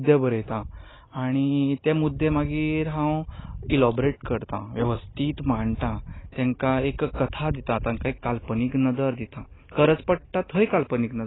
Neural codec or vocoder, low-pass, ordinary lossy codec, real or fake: none; 7.2 kHz; AAC, 16 kbps; real